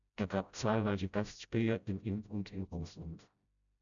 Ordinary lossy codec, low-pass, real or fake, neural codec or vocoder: MP3, 96 kbps; 7.2 kHz; fake; codec, 16 kHz, 0.5 kbps, FreqCodec, smaller model